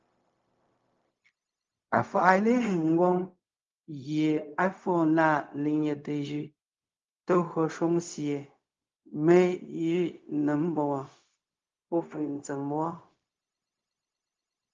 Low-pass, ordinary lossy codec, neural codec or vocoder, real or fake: 7.2 kHz; Opus, 32 kbps; codec, 16 kHz, 0.4 kbps, LongCat-Audio-Codec; fake